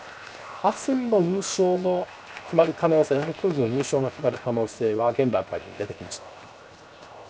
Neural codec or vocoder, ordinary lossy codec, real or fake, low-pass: codec, 16 kHz, 0.7 kbps, FocalCodec; none; fake; none